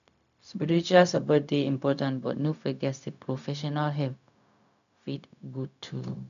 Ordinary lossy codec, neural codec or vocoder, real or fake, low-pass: none; codec, 16 kHz, 0.4 kbps, LongCat-Audio-Codec; fake; 7.2 kHz